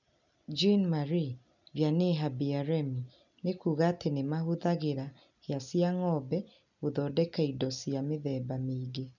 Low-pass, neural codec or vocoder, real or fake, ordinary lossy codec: 7.2 kHz; none; real; none